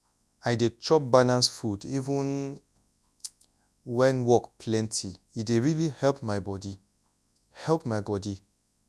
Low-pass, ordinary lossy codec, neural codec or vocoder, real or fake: none; none; codec, 24 kHz, 0.9 kbps, WavTokenizer, large speech release; fake